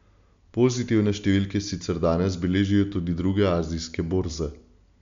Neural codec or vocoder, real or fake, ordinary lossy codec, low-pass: none; real; MP3, 64 kbps; 7.2 kHz